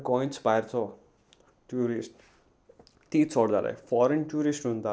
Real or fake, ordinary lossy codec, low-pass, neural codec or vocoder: real; none; none; none